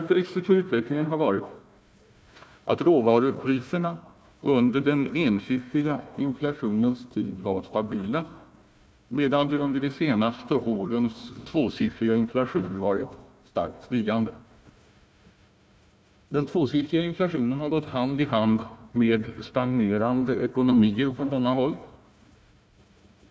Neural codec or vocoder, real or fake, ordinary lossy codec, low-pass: codec, 16 kHz, 1 kbps, FunCodec, trained on Chinese and English, 50 frames a second; fake; none; none